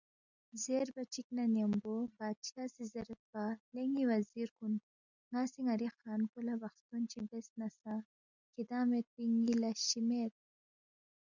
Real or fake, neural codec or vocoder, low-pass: real; none; 7.2 kHz